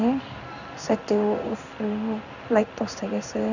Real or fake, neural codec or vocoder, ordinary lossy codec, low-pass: fake; codec, 16 kHz in and 24 kHz out, 1 kbps, XY-Tokenizer; none; 7.2 kHz